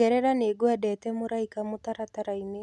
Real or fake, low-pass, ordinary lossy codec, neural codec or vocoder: real; none; none; none